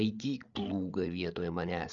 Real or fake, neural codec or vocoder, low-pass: fake; codec, 16 kHz, 8 kbps, FreqCodec, larger model; 7.2 kHz